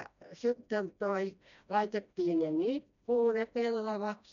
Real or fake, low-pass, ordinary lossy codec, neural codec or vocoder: fake; 7.2 kHz; none; codec, 16 kHz, 1 kbps, FreqCodec, smaller model